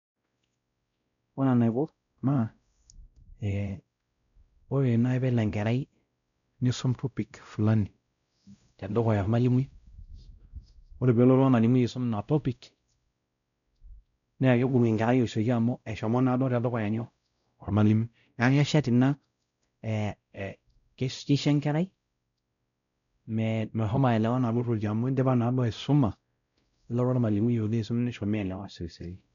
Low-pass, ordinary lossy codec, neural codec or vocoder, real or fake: 7.2 kHz; none; codec, 16 kHz, 0.5 kbps, X-Codec, WavLM features, trained on Multilingual LibriSpeech; fake